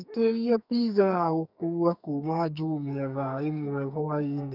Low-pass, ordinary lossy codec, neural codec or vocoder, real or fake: 5.4 kHz; none; codec, 44.1 kHz, 2.6 kbps, SNAC; fake